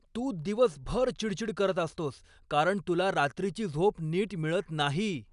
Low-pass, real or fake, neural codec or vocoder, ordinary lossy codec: 10.8 kHz; real; none; none